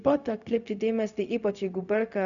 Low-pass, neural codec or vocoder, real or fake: 7.2 kHz; codec, 16 kHz, 0.4 kbps, LongCat-Audio-Codec; fake